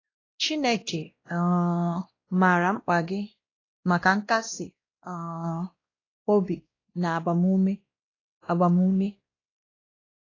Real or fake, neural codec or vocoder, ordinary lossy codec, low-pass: fake; codec, 16 kHz, 1 kbps, X-Codec, WavLM features, trained on Multilingual LibriSpeech; AAC, 32 kbps; 7.2 kHz